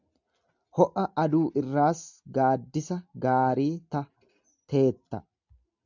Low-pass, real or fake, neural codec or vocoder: 7.2 kHz; real; none